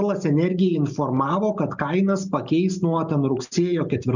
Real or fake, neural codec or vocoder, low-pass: real; none; 7.2 kHz